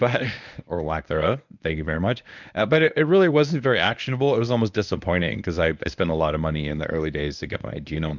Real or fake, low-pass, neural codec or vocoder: fake; 7.2 kHz; codec, 24 kHz, 0.9 kbps, WavTokenizer, medium speech release version 1